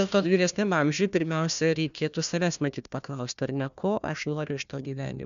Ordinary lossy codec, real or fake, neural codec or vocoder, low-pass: MP3, 96 kbps; fake; codec, 16 kHz, 1 kbps, FunCodec, trained on Chinese and English, 50 frames a second; 7.2 kHz